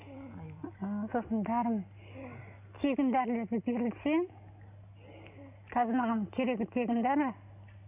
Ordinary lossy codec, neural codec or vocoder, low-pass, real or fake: none; none; 3.6 kHz; real